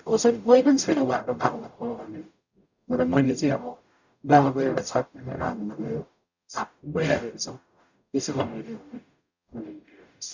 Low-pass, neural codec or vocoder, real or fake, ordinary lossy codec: 7.2 kHz; codec, 44.1 kHz, 0.9 kbps, DAC; fake; none